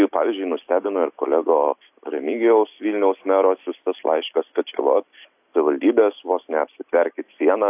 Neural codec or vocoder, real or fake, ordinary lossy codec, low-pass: none; real; AAC, 32 kbps; 3.6 kHz